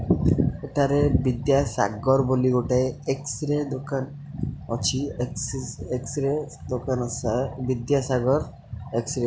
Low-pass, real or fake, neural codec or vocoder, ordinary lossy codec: none; real; none; none